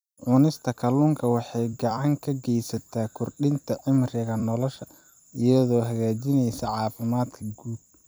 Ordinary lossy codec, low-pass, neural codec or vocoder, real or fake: none; none; vocoder, 44.1 kHz, 128 mel bands every 256 samples, BigVGAN v2; fake